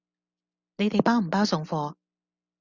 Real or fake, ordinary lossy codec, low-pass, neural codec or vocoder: real; Opus, 64 kbps; 7.2 kHz; none